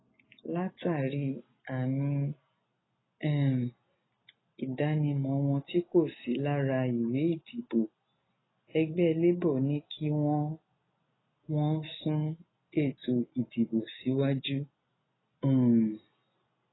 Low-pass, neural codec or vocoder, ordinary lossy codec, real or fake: 7.2 kHz; none; AAC, 16 kbps; real